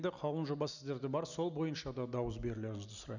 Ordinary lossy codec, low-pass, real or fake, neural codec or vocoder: none; 7.2 kHz; real; none